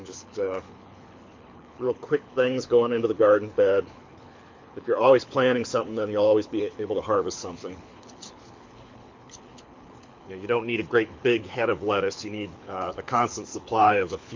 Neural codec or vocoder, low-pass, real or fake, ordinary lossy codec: codec, 24 kHz, 6 kbps, HILCodec; 7.2 kHz; fake; MP3, 48 kbps